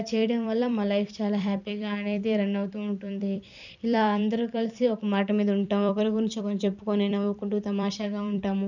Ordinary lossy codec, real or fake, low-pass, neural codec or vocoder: none; fake; 7.2 kHz; vocoder, 44.1 kHz, 128 mel bands every 256 samples, BigVGAN v2